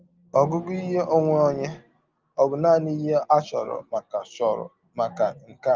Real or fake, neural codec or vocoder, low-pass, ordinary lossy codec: real; none; 7.2 kHz; Opus, 32 kbps